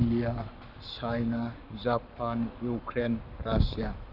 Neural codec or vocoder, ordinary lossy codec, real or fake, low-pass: codec, 44.1 kHz, 7.8 kbps, Pupu-Codec; none; fake; 5.4 kHz